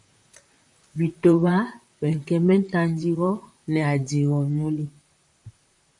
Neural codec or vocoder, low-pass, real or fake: vocoder, 44.1 kHz, 128 mel bands, Pupu-Vocoder; 10.8 kHz; fake